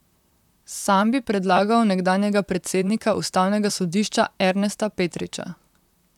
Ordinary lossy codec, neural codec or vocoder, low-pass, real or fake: none; vocoder, 44.1 kHz, 128 mel bands, Pupu-Vocoder; 19.8 kHz; fake